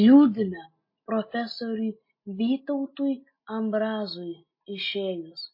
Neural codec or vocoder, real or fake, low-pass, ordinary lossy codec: none; real; 5.4 kHz; MP3, 24 kbps